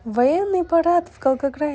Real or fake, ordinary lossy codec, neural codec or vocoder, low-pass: real; none; none; none